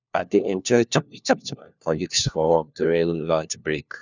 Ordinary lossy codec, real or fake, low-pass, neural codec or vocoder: none; fake; 7.2 kHz; codec, 16 kHz, 1 kbps, FunCodec, trained on LibriTTS, 50 frames a second